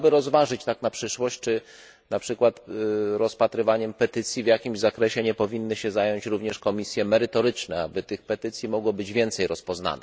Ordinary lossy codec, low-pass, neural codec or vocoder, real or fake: none; none; none; real